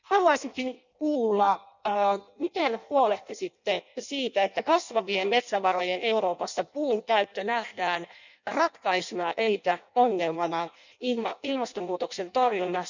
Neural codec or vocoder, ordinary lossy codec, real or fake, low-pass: codec, 16 kHz in and 24 kHz out, 0.6 kbps, FireRedTTS-2 codec; none; fake; 7.2 kHz